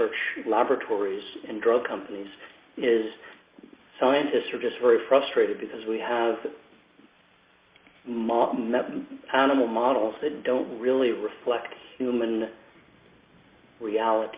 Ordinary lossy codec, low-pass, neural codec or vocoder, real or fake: Opus, 64 kbps; 3.6 kHz; none; real